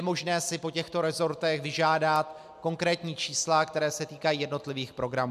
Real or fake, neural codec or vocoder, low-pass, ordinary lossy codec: real; none; 14.4 kHz; AAC, 96 kbps